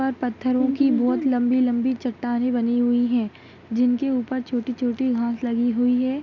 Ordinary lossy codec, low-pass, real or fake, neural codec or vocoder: none; 7.2 kHz; real; none